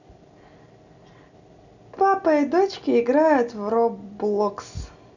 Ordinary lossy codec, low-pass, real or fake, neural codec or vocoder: none; 7.2 kHz; real; none